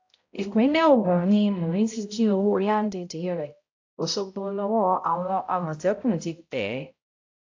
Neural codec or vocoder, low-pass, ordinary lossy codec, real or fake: codec, 16 kHz, 0.5 kbps, X-Codec, HuBERT features, trained on balanced general audio; 7.2 kHz; AAC, 48 kbps; fake